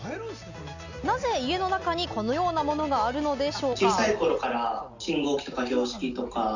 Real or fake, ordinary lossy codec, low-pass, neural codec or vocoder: real; none; 7.2 kHz; none